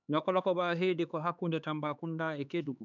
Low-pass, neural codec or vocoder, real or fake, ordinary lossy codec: 7.2 kHz; codec, 16 kHz, 4 kbps, X-Codec, HuBERT features, trained on LibriSpeech; fake; none